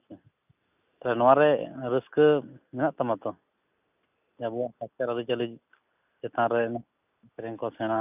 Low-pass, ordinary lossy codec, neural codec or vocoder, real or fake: 3.6 kHz; none; none; real